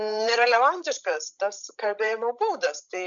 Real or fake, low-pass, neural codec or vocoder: fake; 7.2 kHz; codec, 16 kHz, 16 kbps, FreqCodec, larger model